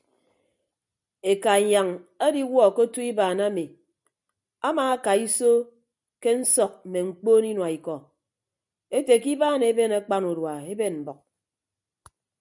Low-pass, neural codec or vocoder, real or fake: 10.8 kHz; none; real